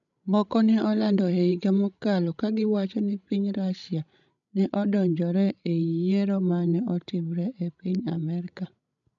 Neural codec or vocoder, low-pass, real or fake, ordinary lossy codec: codec, 16 kHz, 16 kbps, FreqCodec, larger model; 7.2 kHz; fake; none